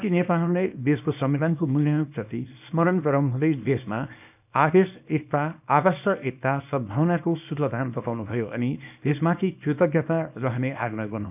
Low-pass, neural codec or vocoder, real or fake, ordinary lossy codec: 3.6 kHz; codec, 24 kHz, 0.9 kbps, WavTokenizer, small release; fake; none